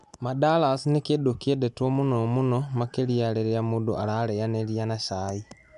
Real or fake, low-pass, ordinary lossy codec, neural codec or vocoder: real; 10.8 kHz; none; none